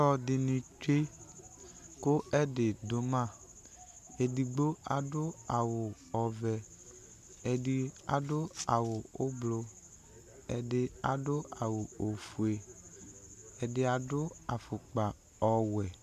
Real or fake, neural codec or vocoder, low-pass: real; none; 14.4 kHz